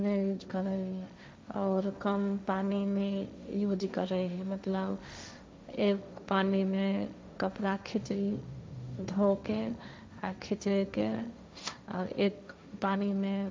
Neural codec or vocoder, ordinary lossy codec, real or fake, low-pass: codec, 16 kHz, 1.1 kbps, Voila-Tokenizer; none; fake; none